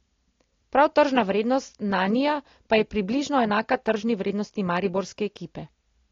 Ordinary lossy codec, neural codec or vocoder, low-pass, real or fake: AAC, 32 kbps; none; 7.2 kHz; real